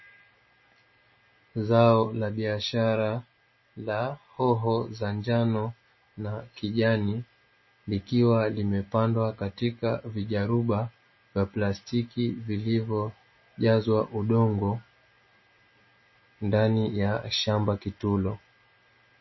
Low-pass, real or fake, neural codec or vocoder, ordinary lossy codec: 7.2 kHz; real; none; MP3, 24 kbps